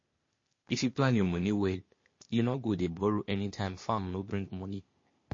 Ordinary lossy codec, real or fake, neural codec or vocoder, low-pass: MP3, 32 kbps; fake; codec, 16 kHz, 0.8 kbps, ZipCodec; 7.2 kHz